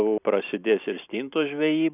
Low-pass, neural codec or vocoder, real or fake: 3.6 kHz; vocoder, 44.1 kHz, 128 mel bands every 256 samples, BigVGAN v2; fake